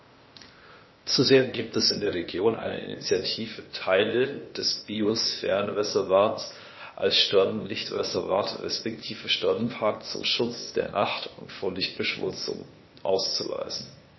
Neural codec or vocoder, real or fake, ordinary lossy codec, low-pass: codec, 16 kHz, 0.8 kbps, ZipCodec; fake; MP3, 24 kbps; 7.2 kHz